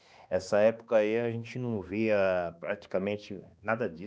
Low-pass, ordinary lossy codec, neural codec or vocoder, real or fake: none; none; codec, 16 kHz, 2 kbps, X-Codec, HuBERT features, trained on balanced general audio; fake